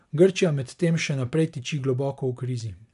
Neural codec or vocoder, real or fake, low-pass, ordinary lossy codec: none; real; 10.8 kHz; MP3, 96 kbps